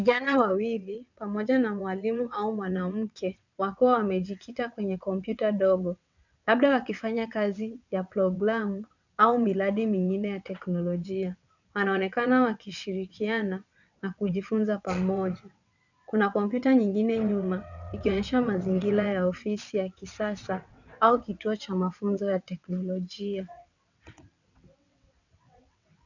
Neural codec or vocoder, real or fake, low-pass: vocoder, 22.05 kHz, 80 mel bands, WaveNeXt; fake; 7.2 kHz